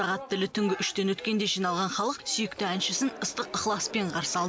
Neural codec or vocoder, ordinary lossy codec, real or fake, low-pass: none; none; real; none